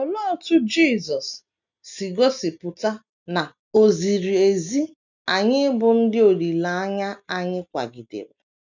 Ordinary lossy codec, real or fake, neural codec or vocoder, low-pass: none; real; none; 7.2 kHz